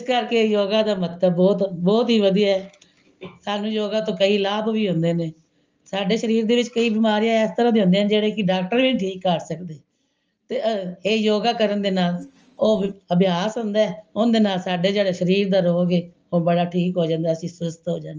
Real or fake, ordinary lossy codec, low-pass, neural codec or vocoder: real; Opus, 24 kbps; 7.2 kHz; none